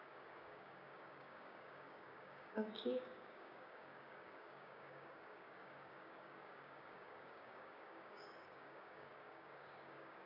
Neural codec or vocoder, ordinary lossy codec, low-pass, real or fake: codec, 16 kHz, 6 kbps, DAC; none; 5.4 kHz; fake